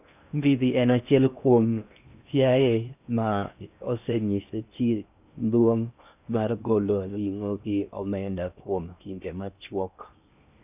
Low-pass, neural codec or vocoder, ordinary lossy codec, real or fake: 3.6 kHz; codec, 16 kHz in and 24 kHz out, 0.6 kbps, FocalCodec, streaming, 2048 codes; none; fake